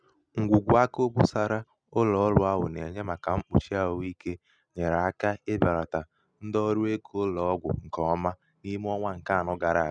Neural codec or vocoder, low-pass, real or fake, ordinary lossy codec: none; 9.9 kHz; real; none